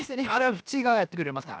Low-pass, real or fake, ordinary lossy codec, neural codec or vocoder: none; fake; none; codec, 16 kHz, 0.7 kbps, FocalCodec